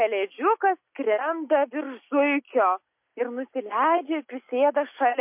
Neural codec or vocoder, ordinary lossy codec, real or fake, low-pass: none; MP3, 32 kbps; real; 3.6 kHz